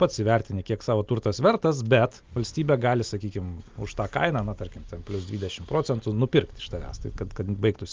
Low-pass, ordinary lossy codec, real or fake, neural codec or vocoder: 7.2 kHz; Opus, 24 kbps; real; none